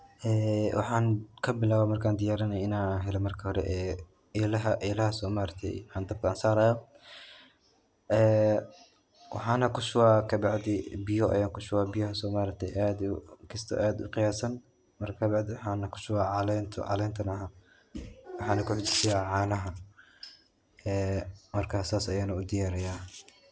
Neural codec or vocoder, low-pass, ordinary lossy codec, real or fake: none; none; none; real